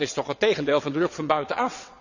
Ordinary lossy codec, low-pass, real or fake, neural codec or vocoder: none; 7.2 kHz; fake; vocoder, 22.05 kHz, 80 mel bands, WaveNeXt